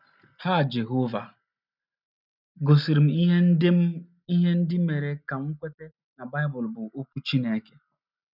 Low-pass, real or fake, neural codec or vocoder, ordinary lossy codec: 5.4 kHz; real; none; none